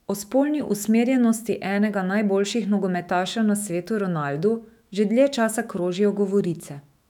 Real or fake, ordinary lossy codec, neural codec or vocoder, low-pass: fake; none; autoencoder, 48 kHz, 128 numbers a frame, DAC-VAE, trained on Japanese speech; 19.8 kHz